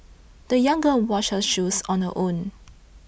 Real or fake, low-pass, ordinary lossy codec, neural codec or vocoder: real; none; none; none